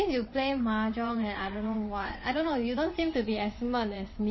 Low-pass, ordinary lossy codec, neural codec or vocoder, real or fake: 7.2 kHz; MP3, 24 kbps; vocoder, 22.05 kHz, 80 mel bands, WaveNeXt; fake